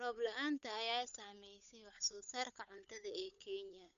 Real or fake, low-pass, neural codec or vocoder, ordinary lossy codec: fake; 7.2 kHz; codec, 16 kHz, 8 kbps, FunCodec, trained on Chinese and English, 25 frames a second; none